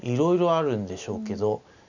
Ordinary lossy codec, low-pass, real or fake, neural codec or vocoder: none; 7.2 kHz; real; none